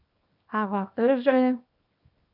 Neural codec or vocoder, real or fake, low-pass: codec, 24 kHz, 0.9 kbps, WavTokenizer, small release; fake; 5.4 kHz